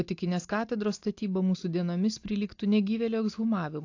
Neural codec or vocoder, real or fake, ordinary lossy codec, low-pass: none; real; AAC, 48 kbps; 7.2 kHz